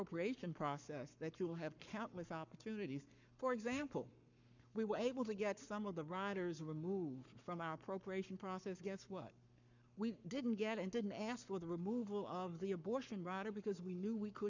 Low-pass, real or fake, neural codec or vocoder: 7.2 kHz; fake; codec, 44.1 kHz, 7.8 kbps, Pupu-Codec